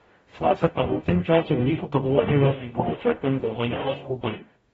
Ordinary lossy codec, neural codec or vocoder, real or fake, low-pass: AAC, 24 kbps; codec, 44.1 kHz, 0.9 kbps, DAC; fake; 19.8 kHz